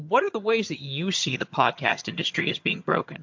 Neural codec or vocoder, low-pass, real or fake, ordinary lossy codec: vocoder, 22.05 kHz, 80 mel bands, HiFi-GAN; 7.2 kHz; fake; MP3, 48 kbps